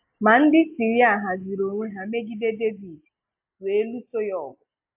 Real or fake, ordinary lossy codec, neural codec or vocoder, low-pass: real; AAC, 32 kbps; none; 3.6 kHz